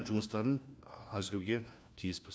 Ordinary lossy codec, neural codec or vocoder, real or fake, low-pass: none; codec, 16 kHz, 1 kbps, FunCodec, trained on LibriTTS, 50 frames a second; fake; none